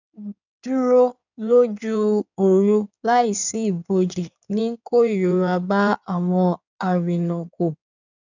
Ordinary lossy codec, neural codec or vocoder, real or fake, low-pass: none; codec, 16 kHz in and 24 kHz out, 2.2 kbps, FireRedTTS-2 codec; fake; 7.2 kHz